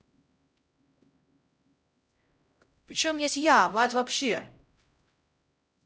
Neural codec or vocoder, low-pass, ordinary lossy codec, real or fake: codec, 16 kHz, 0.5 kbps, X-Codec, HuBERT features, trained on LibriSpeech; none; none; fake